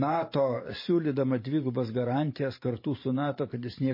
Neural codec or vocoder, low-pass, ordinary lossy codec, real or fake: vocoder, 44.1 kHz, 128 mel bands every 512 samples, BigVGAN v2; 5.4 kHz; MP3, 24 kbps; fake